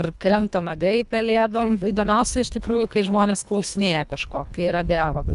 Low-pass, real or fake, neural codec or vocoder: 10.8 kHz; fake; codec, 24 kHz, 1.5 kbps, HILCodec